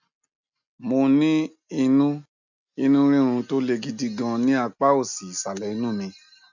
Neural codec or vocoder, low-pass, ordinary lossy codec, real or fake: none; 7.2 kHz; none; real